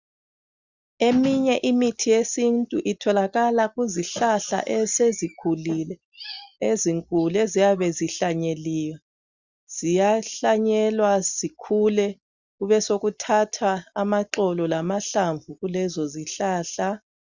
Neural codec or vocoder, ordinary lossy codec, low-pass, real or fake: none; Opus, 64 kbps; 7.2 kHz; real